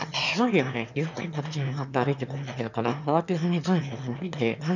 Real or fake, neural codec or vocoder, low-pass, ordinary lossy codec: fake; autoencoder, 22.05 kHz, a latent of 192 numbers a frame, VITS, trained on one speaker; 7.2 kHz; none